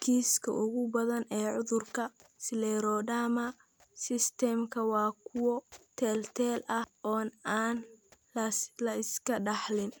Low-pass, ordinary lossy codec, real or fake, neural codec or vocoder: none; none; real; none